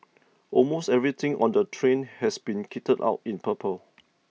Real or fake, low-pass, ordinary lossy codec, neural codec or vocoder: real; none; none; none